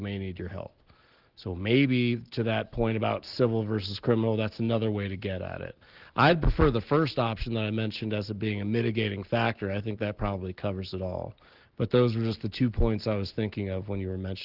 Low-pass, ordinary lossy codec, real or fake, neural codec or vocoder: 5.4 kHz; Opus, 16 kbps; real; none